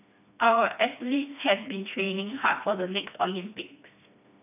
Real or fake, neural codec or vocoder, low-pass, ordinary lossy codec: fake; codec, 16 kHz, 2 kbps, FreqCodec, smaller model; 3.6 kHz; none